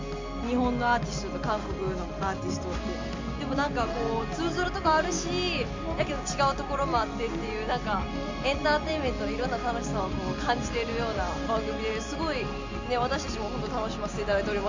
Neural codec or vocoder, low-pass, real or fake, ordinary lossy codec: none; 7.2 kHz; real; MP3, 64 kbps